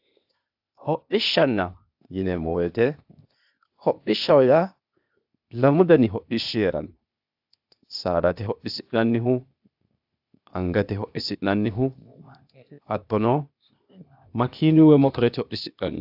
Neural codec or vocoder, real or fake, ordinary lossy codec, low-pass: codec, 16 kHz, 0.8 kbps, ZipCodec; fake; AAC, 48 kbps; 5.4 kHz